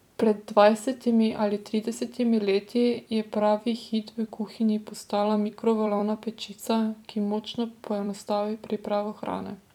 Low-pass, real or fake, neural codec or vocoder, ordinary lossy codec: 19.8 kHz; fake; vocoder, 44.1 kHz, 128 mel bands every 256 samples, BigVGAN v2; none